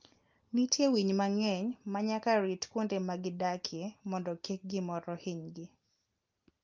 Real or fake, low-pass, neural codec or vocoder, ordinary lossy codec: real; 7.2 kHz; none; Opus, 24 kbps